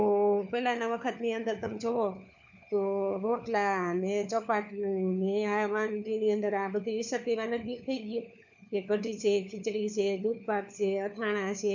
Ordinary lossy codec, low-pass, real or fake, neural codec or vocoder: none; 7.2 kHz; fake; codec, 16 kHz, 4 kbps, FunCodec, trained on LibriTTS, 50 frames a second